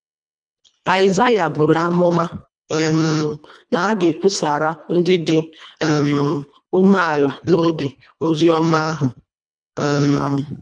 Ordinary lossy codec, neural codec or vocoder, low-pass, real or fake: none; codec, 24 kHz, 1.5 kbps, HILCodec; 9.9 kHz; fake